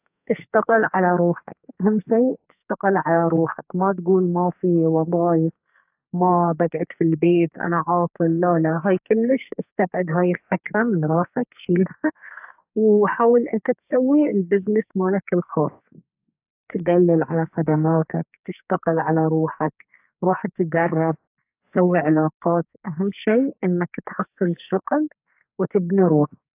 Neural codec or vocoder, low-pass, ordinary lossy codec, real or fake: codec, 44.1 kHz, 2.6 kbps, SNAC; 3.6 kHz; AAC, 32 kbps; fake